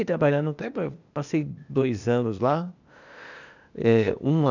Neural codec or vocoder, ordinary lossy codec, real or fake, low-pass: codec, 16 kHz, 0.8 kbps, ZipCodec; none; fake; 7.2 kHz